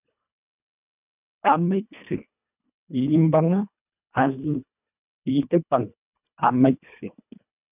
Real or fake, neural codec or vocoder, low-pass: fake; codec, 24 kHz, 1.5 kbps, HILCodec; 3.6 kHz